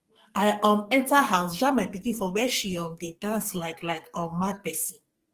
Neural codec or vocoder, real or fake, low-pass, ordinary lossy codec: codec, 44.1 kHz, 2.6 kbps, SNAC; fake; 14.4 kHz; Opus, 24 kbps